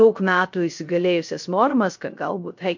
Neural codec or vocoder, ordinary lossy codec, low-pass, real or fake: codec, 16 kHz, 0.3 kbps, FocalCodec; MP3, 48 kbps; 7.2 kHz; fake